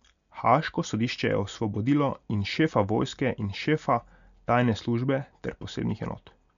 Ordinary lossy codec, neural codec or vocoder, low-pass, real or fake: MP3, 96 kbps; none; 7.2 kHz; real